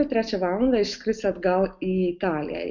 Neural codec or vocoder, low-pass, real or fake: none; 7.2 kHz; real